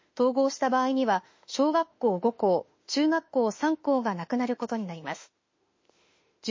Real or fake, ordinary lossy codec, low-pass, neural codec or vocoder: fake; MP3, 32 kbps; 7.2 kHz; autoencoder, 48 kHz, 32 numbers a frame, DAC-VAE, trained on Japanese speech